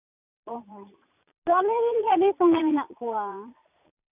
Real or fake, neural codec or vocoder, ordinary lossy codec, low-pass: fake; vocoder, 22.05 kHz, 80 mel bands, Vocos; none; 3.6 kHz